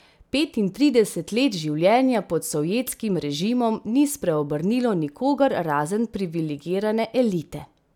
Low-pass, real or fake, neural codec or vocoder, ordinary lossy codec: 19.8 kHz; real; none; none